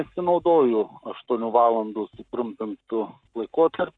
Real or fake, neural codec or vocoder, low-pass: fake; codec, 44.1 kHz, 7.8 kbps, Pupu-Codec; 9.9 kHz